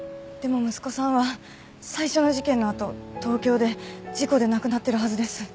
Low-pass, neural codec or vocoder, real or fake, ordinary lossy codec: none; none; real; none